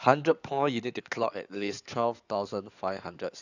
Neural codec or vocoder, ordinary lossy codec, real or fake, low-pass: codec, 16 kHz in and 24 kHz out, 2.2 kbps, FireRedTTS-2 codec; none; fake; 7.2 kHz